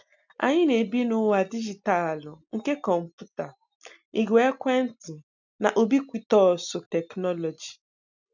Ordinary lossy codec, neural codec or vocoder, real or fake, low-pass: none; none; real; 7.2 kHz